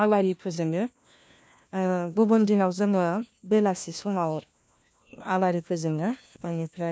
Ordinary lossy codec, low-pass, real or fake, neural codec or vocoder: none; none; fake; codec, 16 kHz, 1 kbps, FunCodec, trained on LibriTTS, 50 frames a second